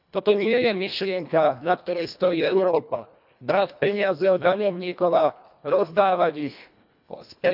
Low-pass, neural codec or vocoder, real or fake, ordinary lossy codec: 5.4 kHz; codec, 24 kHz, 1.5 kbps, HILCodec; fake; none